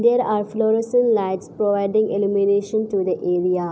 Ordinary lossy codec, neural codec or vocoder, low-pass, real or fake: none; none; none; real